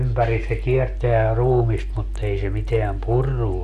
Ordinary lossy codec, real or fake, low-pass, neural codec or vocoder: MP3, 64 kbps; real; 14.4 kHz; none